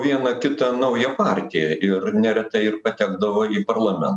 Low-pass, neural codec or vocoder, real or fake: 10.8 kHz; none; real